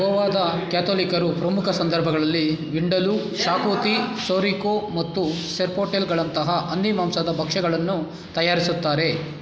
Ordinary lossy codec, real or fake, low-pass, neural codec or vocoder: none; real; none; none